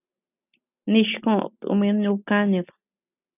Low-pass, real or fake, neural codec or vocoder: 3.6 kHz; real; none